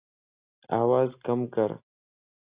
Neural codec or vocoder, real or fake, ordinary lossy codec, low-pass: none; real; Opus, 64 kbps; 3.6 kHz